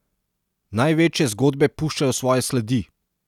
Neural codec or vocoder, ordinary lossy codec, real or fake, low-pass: none; none; real; 19.8 kHz